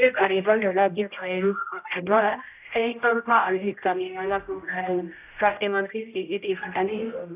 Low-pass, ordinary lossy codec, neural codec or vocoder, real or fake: 3.6 kHz; none; codec, 16 kHz, 0.5 kbps, X-Codec, HuBERT features, trained on general audio; fake